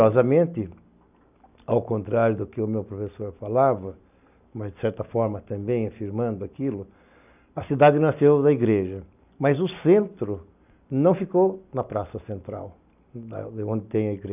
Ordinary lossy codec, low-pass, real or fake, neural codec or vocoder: none; 3.6 kHz; real; none